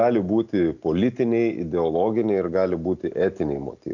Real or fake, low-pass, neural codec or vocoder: real; 7.2 kHz; none